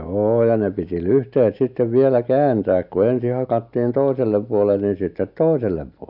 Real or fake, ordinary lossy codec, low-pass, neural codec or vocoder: real; none; 5.4 kHz; none